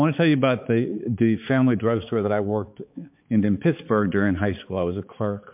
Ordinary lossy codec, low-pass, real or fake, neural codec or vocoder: MP3, 32 kbps; 3.6 kHz; fake; codec, 16 kHz, 4 kbps, X-Codec, HuBERT features, trained on balanced general audio